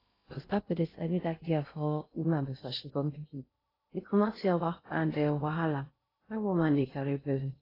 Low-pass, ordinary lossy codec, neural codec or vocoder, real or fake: 5.4 kHz; AAC, 24 kbps; codec, 16 kHz in and 24 kHz out, 0.6 kbps, FocalCodec, streaming, 2048 codes; fake